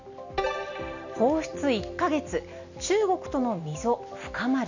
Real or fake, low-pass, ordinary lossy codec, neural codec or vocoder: real; 7.2 kHz; none; none